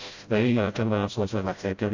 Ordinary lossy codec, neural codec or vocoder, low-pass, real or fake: AAC, 48 kbps; codec, 16 kHz, 0.5 kbps, FreqCodec, smaller model; 7.2 kHz; fake